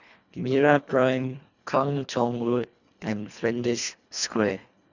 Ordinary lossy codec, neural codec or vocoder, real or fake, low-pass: none; codec, 24 kHz, 1.5 kbps, HILCodec; fake; 7.2 kHz